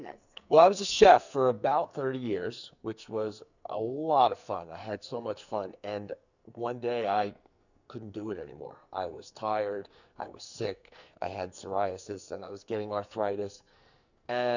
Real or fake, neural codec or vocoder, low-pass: fake; codec, 44.1 kHz, 2.6 kbps, SNAC; 7.2 kHz